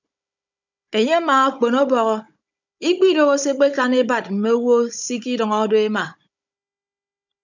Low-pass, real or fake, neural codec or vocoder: 7.2 kHz; fake; codec, 16 kHz, 16 kbps, FunCodec, trained on Chinese and English, 50 frames a second